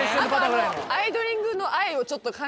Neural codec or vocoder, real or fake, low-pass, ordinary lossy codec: none; real; none; none